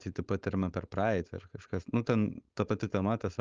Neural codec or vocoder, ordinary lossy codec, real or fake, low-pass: codec, 16 kHz, 4.8 kbps, FACodec; Opus, 24 kbps; fake; 7.2 kHz